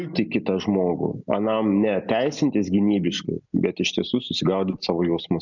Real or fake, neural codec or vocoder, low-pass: real; none; 7.2 kHz